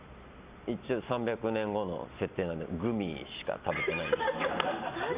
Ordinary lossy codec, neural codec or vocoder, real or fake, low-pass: Opus, 64 kbps; none; real; 3.6 kHz